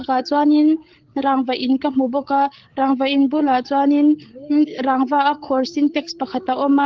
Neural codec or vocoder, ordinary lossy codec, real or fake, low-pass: codec, 16 kHz, 8 kbps, FreqCodec, larger model; Opus, 16 kbps; fake; 7.2 kHz